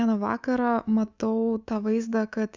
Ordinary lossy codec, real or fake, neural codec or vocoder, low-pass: Opus, 64 kbps; fake; codec, 24 kHz, 3.1 kbps, DualCodec; 7.2 kHz